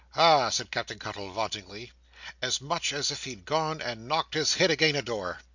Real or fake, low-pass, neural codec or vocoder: real; 7.2 kHz; none